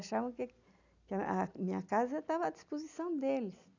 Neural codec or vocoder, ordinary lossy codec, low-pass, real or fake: none; none; 7.2 kHz; real